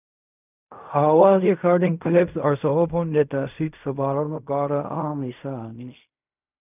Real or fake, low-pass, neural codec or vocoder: fake; 3.6 kHz; codec, 16 kHz in and 24 kHz out, 0.4 kbps, LongCat-Audio-Codec, fine tuned four codebook decoder